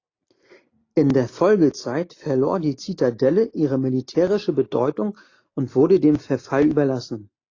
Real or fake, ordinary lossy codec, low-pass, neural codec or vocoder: real; AAC, 32 kbps; 7.2 kHz; none